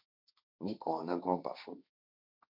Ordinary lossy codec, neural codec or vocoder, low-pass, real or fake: MP3, 48 kbps; codec, 16 kHz, 1.1 kbps, Voila-Tokenizer; 5.4 kHz; fake